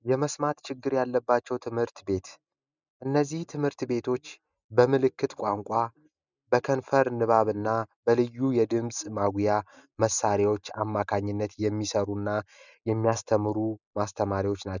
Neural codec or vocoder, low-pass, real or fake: none; 7.2 kHz; real